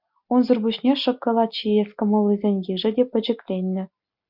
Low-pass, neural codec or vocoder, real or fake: 5.4 kHz; none; real